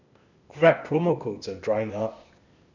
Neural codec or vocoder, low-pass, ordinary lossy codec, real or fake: codec, 16 kHz, 0.8 kbps, ZipCodec; 7.2 kHz; none; fake